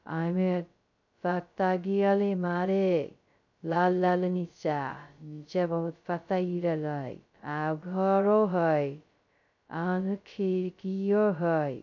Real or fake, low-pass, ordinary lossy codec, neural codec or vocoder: fake; 7.2 kHz; none; codec, 16 kHz, 0.2 kbps, FocalCodec